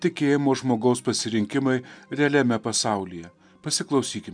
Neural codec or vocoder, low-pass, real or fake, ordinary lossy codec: none; 9.9 kHz; real; MP3, 96 kbps